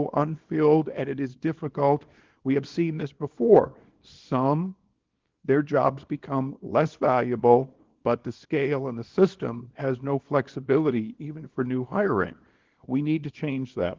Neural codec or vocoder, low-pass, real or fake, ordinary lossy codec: codec, 24 kHz, 0.9 kbps, WavTokenizer, small release; 7.2 kHz; fake; Opus, 16 kbps